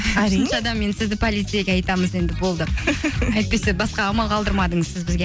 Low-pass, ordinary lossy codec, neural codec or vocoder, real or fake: none; none; none; real